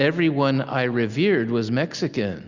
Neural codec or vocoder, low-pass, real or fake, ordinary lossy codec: none; 7.2 kHz; real; Opus, 64 kbps